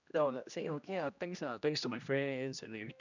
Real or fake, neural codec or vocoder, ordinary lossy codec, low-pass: fake; codec, 16 kHz, 1 kbps, X-Codec, HuBERT features, trained on general audio; none; 7.2 kHz